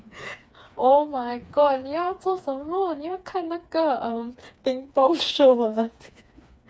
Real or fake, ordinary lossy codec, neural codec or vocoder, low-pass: fake; none; codec, 16 kHz, 4 kbps, FreqCodec, smaller model; none